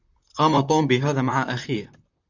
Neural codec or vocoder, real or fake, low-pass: vocoder, 44.1 kHz, 128 mel bands, Pupu-Vocoder; fake; 7.2 kHz